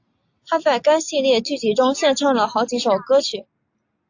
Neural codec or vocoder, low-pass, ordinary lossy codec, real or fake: none; 7.2 kHz; AAC, 48 kbps; real